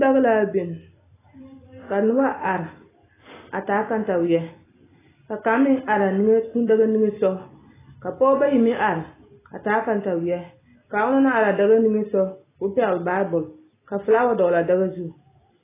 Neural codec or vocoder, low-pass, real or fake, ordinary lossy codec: none; 3.6 kHz; real; AAC, 16 kbps